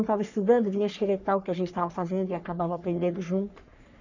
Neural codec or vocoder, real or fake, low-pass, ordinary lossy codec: codec, 44.1 kHz, 3.4 kbps, Pupu-Codec; fake; 7.2 kHz; none